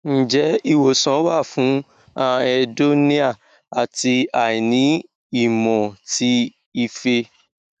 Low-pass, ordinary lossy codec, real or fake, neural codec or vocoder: 14.4 kHz; none; fake; autoencoder, 48 kHz, 128 numbers a frame, DAC-VAE, trained on Japanese speech